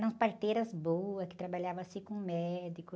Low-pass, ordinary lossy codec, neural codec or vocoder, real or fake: none; none; none; real